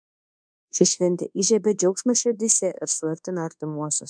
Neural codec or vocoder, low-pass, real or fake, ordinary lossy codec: codec, 24 kHz, 1.2 kbps, DualCodec; 9.9 kHz; fake; MP3, 96 kbps